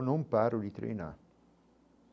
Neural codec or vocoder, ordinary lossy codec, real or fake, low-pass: none; none; real; none